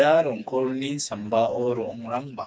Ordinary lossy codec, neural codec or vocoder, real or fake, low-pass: none; codec, 16 kHz, 2 kbps, FreqCodec, smaller model; fake; none